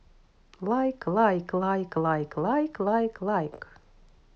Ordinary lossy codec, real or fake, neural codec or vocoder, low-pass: none; real; none; none